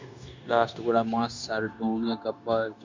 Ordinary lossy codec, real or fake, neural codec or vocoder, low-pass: MP3, 64 kbps; fake; codec, 16 kHz, 0.9 kbps, LongCat-Audio-Codec; 7.2 kHz